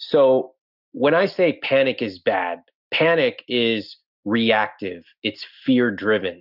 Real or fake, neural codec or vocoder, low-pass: real; none; 5.4 kHz